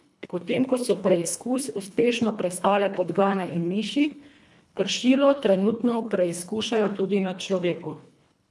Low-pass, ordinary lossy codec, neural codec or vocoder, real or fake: none; none; codec, 24 kHz, 1.5 kbps, HILCodec; fake